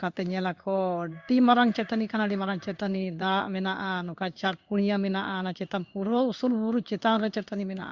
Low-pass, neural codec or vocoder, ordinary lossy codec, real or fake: 7.2 kHz; codec, 16 kHz in and 24 kHz out, 1 kbps, XY-Tokenizer; Opus, 64 kbps; fake